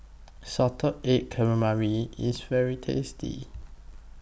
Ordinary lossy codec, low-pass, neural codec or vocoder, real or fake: none; none; none; real